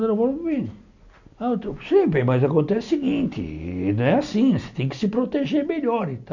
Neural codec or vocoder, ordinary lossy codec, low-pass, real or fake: none; none; 7.2 kHz; real